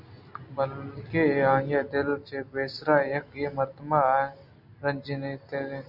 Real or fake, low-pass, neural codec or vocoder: real; 5.4 kHz; none